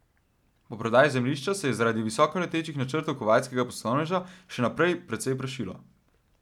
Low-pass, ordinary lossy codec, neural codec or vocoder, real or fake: 19.8 kHz; none; none; real